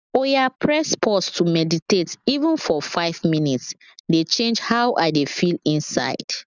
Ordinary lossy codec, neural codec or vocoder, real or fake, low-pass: none; none; real; 7.2 kHz